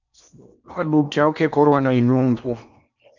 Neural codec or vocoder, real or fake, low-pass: codec, 16 kHz in and 24 kHz out, 0.8 kbps, FocalCodec, streaming, 65536 codes; fake; 7.2 kHz